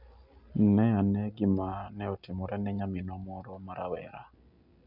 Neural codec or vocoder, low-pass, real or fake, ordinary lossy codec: none; 5.4 kHz; real; none